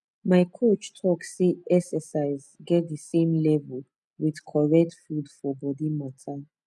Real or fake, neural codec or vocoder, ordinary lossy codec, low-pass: real; none; none; none